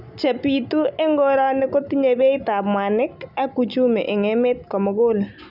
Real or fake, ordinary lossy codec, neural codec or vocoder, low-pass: real; none; none; 5.4 kHz